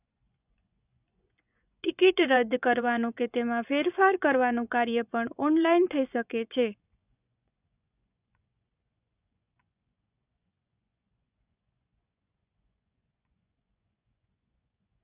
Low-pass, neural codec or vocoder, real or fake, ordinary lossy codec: 3.6 kHz; vocoder, 24 kHz, 100 mel bands, Vocos; fake; none